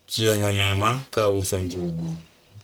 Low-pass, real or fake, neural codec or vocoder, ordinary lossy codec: none; fake; codec, 44.1 kHz, 1.7 kbps, Pupu-Codec; none